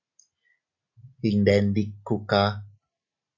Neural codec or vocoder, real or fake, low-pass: none; real; 7.2 kHz